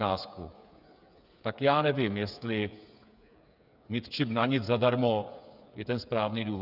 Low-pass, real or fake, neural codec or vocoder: 5.4 kHz; fake; codec, 16 kHz, 8 kbps, FreqCodec, smaller model